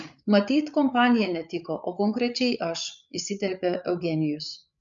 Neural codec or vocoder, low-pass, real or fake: codec, 16 kHz, 8 kbps, FreqCodec, larger model; 7.2 kHz; fake